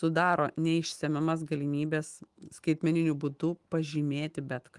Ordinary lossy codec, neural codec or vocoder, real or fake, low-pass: Opus, 24 kbps; autoencoder, 48 kHz, 128 numbers a frame, DAC-VAE, trained on Japanese speech; fake; 10.8 kHz